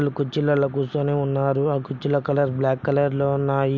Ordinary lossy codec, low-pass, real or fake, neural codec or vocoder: none; none; real; none